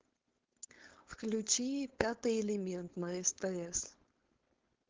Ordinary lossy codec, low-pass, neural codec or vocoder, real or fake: Opus, 16 kbps; 7.2 kHz; codec, 16 kHz, 4.8 kbps, FACodec; fake